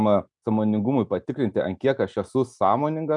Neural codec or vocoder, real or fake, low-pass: none; real; 10.8 kHz